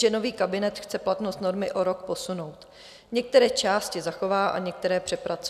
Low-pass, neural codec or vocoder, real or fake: 14.4 kHz; none; real